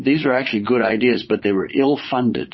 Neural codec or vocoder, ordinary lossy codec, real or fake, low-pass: vocoder, 22.05 kHz, 80 mel bands, Vocos; MP3, 24 kbps; fake; 7.2 kHz